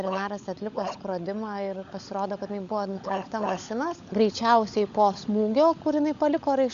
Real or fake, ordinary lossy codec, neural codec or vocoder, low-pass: fake; Opus, 64 kbps; codec, 16 kHz, 16 kbps, FunCodec, trained on LibriTTS, 50 frames a second; 7.2 kHz